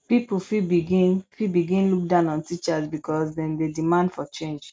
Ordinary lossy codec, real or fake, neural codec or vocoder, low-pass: none; real; none; none